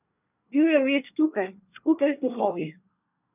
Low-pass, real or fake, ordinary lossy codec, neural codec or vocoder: 3.6 kHz; fake; none; codec, 24 kHz, 1 kbps, SNAC